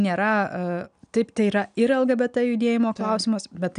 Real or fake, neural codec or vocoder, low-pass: real; none; 9.9 kHz